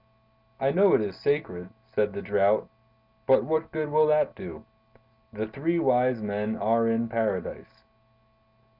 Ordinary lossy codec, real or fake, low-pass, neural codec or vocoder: Opus, 64 kbps; real; 5.4 kHz; none